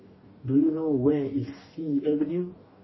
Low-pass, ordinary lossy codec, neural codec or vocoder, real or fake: 7.2 kHz; MP3, 24 kbps; codec, 44.1 kHz, 2.6 kbps, DAC; fake